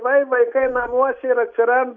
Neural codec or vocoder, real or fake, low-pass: none; real; 7.2 kHz